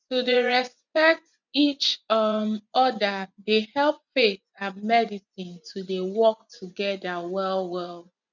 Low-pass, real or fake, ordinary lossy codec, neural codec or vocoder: 7.2 kHz; fake; none; vocoder, 24 kHz, 100 mel bands, Vocos